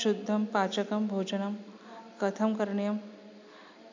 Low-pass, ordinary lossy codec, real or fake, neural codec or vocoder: 7.2 kHz; MP3, 64 kbps; real; none